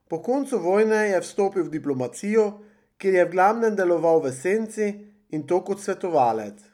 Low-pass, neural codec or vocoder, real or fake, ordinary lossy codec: 19.8 kHz; none; real; none